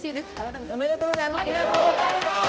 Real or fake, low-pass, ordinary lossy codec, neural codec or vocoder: fake; none; none; codec, 16 kHz, 0.5 kbps, X-Codec, HuBERT features, trained on balanced general audio